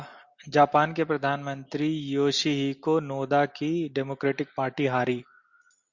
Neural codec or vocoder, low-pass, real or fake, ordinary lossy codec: none; 7.2 kHz; real; Opus, 64 kbps